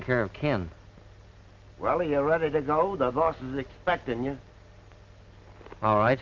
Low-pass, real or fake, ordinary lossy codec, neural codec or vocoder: 7.2 kHz; real; Opus, 24 kbps; none